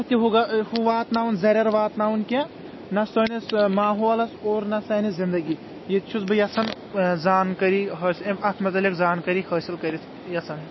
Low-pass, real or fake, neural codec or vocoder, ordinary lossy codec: 7.2 kHz; real; none; MP3, 24 kbps